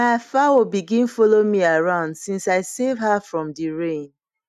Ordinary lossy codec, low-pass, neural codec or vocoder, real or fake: none; 14.4 kHz; none; real